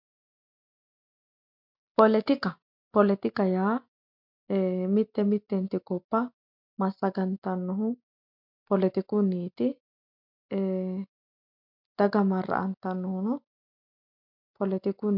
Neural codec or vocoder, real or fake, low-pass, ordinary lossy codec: none; real; 5.4 kHz; MP3, 48 kbps